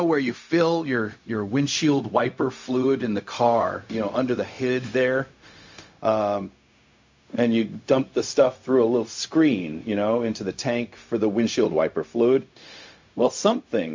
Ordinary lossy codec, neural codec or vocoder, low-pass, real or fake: MP3, 48 kbps; codec, 16 kHz, 0.4 kbps, LongCat-Audio-Codec; 7.2 kHz; fake